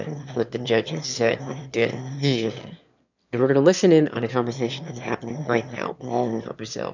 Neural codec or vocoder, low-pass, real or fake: autoencoder, 22.05 kHz, a latent of 192 numbers a frame, VITS, trained on one speaker; 7.2 kHz; fake